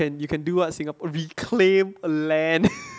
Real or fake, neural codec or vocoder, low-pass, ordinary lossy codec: real; none; none; none